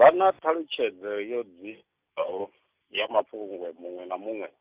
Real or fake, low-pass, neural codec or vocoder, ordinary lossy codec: real; 3.6 kHz; none; Opus, 64 kbps